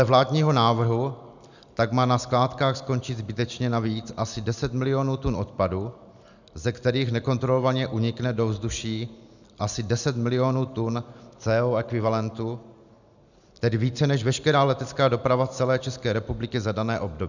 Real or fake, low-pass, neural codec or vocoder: real; 7.2 kHz; none